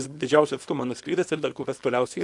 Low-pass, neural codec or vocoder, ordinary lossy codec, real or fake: 10.8 kHz; codec, 24 kHz, 0.9 kbps, WavTokenizer, small release; AAC, 64 kbps; fake